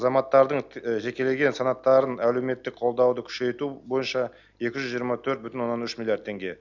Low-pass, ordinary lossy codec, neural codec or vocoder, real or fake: 7.2 kHz; none; none; real